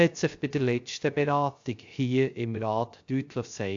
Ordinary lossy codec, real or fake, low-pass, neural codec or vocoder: AAC, 64 kbps; fake; 7.2 kHz; codec, 16 kHz, 0.3 kbps, FocalCodec